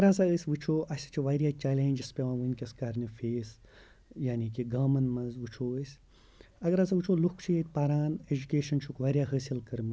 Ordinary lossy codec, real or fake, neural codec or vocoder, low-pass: none; real; none; none